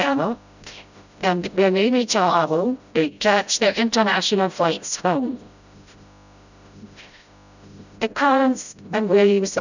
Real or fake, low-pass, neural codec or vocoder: fake; 7.2 kHz; codec, 16 kHz, 0.5 kbps, FreqCodec, smaller model